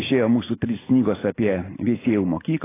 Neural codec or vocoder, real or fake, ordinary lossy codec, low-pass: none; real; AAC, 16 kbps; 3.6 kHz